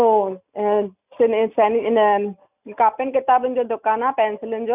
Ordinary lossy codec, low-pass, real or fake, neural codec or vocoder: none; 3.6 kHz; real; none